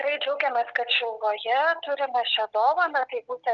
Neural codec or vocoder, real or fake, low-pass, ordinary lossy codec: none; real; 7.2 kHz; Opus, 24 kbps